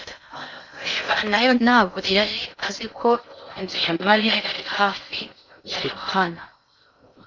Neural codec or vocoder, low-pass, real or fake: codec, 16 kHz in and 24 kHz out, 0.6 kbps, FocalCodec, streaming, 2048 codes; 7.2 kHz; fake